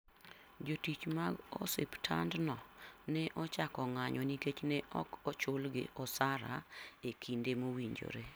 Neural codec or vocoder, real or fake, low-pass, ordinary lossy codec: none; real; none; none